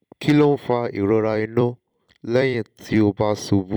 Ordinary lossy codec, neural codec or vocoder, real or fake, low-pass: none; vocoder, 44.1 kHz, 128 mel bands every 256 samples, BigVGAN v2; fake; 19.8 kHz